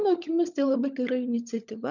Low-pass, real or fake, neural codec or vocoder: 7.2 kHz; fake; codec, 16 kHz, 16 kbps, FunCodec, trained on LibriTTS, 50 frames a second